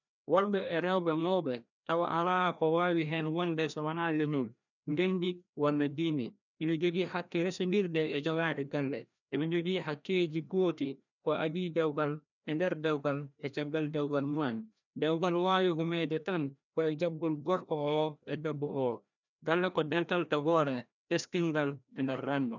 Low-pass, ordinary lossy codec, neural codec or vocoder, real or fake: 7.2 kHz; none; codec, 16 kHz, 1 kbps, FreqCodec, larger model; fake